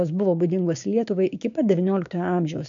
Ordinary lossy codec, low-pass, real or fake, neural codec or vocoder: AAC, 64 kbps; 7.2 kHz; fake; codec, 16 kHz, 6 kbps, DAC